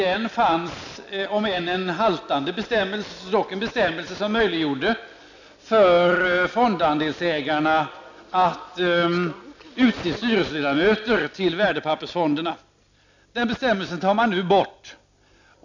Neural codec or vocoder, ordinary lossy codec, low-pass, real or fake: none; none; 7.2 kHz; real